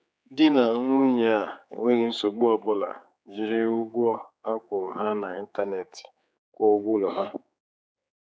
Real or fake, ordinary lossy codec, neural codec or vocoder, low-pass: fake; none; codec, 16 kHz, 4 kbps, X-Codec, HuBERT features, trained on general audio; none